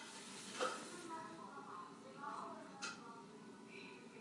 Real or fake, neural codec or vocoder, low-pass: real; none; 10.8 kHz